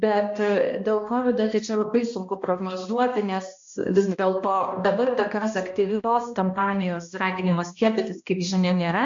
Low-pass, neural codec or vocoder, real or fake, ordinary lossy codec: 7.2 kHz; codec, 16 kHz, 1 kbps, X-Codec, HuBERT features, trained on balanced general audio; fake; MP3, 48 kbps